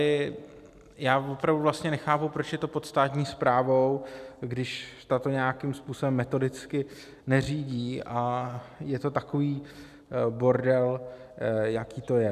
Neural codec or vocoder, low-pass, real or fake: none; 14.4 kHz; real